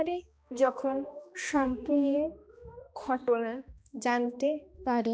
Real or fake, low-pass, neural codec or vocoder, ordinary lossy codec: fake; none; codec, 16 kHz, 1 kbps, X-Codec, HuBERT features, trained on balanced general audio; none